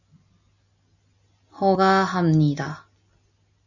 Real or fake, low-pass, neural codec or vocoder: real; 7.2 kHz; none